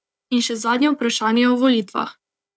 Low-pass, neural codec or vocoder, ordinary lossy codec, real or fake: none; codec, 16 kHz, 4 kbps, FunCodec, trained on Chinese and English, 50 frames a second; none; fake